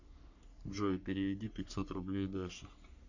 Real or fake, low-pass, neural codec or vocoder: fake; 7.2 kHz; codec, 44.1 kHz, 3.4 kbps, Pupu-Codec